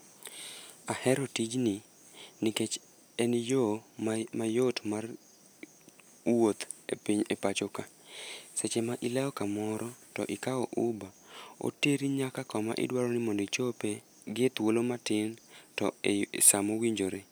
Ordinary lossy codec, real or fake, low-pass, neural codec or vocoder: none; real; none; none